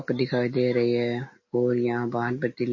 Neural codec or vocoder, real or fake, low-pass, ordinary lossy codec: none; real; 7.2 kHz; MP3, 32 kbps